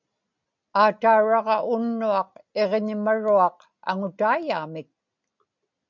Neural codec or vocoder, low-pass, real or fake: none; 7.2 kHz; real